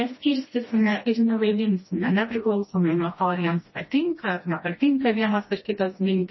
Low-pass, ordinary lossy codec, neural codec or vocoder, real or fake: 7.2 kHz; MP3, 24 kbps; codec, 16 kHz, 1 kbps, FreqCodec, smaller model; fake